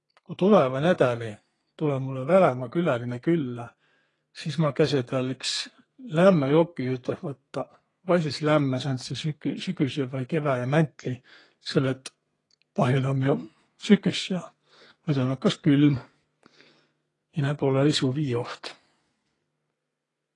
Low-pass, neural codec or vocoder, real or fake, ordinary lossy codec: 10.8 kHz; codec, 32 kHz, 1.9 kbps, SNAC; fake; AAC, 32 kbps